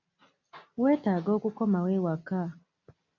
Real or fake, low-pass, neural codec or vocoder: real; 7.2 kHz; none